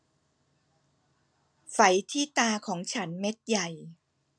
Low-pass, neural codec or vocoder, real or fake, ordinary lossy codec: 9.9 kHz; none; real; none